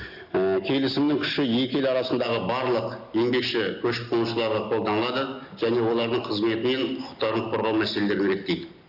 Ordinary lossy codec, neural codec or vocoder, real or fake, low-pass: Opus, 64 kbps; none; real; 5.4 kHz